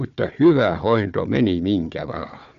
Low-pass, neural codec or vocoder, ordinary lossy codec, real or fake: 7.2 kHz; codec, 16 kHz, 4 kbps, FunCodec, trained on Chinese and English, 50 frames a second; none; fake